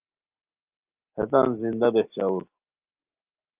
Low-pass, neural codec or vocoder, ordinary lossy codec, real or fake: 3.6 kHz; none; Opus, 24 kbps; real